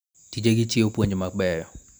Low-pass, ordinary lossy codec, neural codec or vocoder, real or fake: none; none; none; real